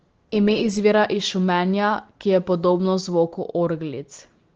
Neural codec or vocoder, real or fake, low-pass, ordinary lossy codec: none; real; 7.2 kHz; Opus, 16 kbps